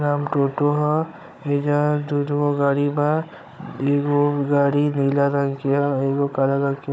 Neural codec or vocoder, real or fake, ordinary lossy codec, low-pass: codec, 16 kHz, 16 kbps, FunCodec, trained on Chinese and English, 50 frames a second; fake; none; none